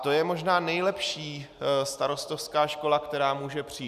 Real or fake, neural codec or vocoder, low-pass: real; none; 14.4 kHz